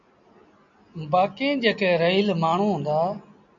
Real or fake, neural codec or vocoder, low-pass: real; none; 7.2 kHz